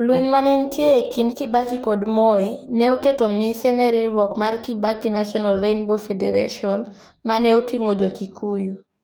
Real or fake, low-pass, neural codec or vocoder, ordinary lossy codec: fake; none; codec, 44.1 kHz, 2.6 kbps, DAC; none